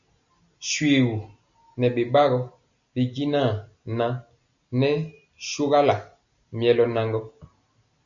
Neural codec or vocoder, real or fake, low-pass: none; real; 7.2 kHz